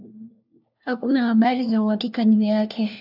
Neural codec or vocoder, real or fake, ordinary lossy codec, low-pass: codec, 16 kHz, 1 kbps, FunCodec, trained on LibriTTS, 50 frames a second; fake; AAC, 48 kbps; 5.4 kHz